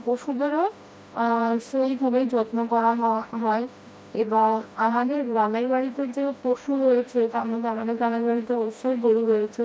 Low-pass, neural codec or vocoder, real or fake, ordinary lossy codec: none; codec, 16 kHz, 1 kbps, FreqCodec, smaller model; fake; none